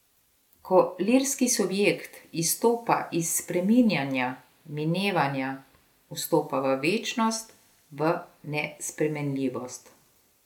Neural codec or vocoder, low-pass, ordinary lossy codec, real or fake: none; 19.8 kHz; none; real